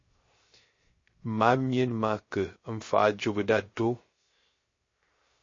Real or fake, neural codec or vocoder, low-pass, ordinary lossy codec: fake; codec, 16 kHz, 0.3 kbps, FocalCodec; 7.2 kHz; MP3, 32 kbps